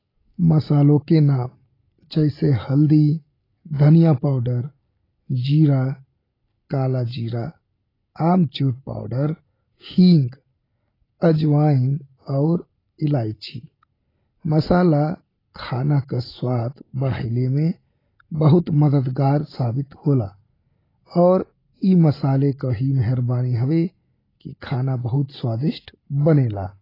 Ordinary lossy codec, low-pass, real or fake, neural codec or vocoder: AAC, 24 kbps; 5.4 kHz; real; none